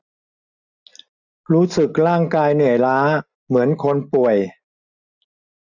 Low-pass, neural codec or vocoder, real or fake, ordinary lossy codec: 7.2 kHz; none; real; none